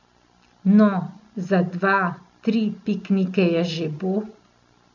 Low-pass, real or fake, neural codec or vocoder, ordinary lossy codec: 7.2 kHz; real; none; none